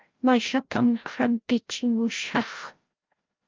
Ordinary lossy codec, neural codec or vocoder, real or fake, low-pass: Opus, 24 kbps; codec, 16 kHz, 0.5 kbps, FreqCodec, larger model; fake; 7.2 kHz